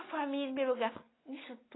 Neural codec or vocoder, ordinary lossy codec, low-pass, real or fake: none; AAC, 16 kbps; 7.2 kHz; real